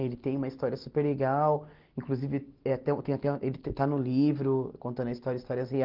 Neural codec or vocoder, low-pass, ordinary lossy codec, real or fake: none; 5.4 kHz; Opus, 16 kbps; real